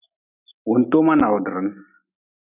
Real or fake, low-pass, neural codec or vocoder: real; 3.6 kHz; none